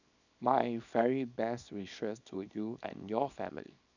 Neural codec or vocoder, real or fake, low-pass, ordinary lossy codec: codec, 24 kHz, 0.9 kbps, WavTokenizer, small release; fake; 7.2 kHz; none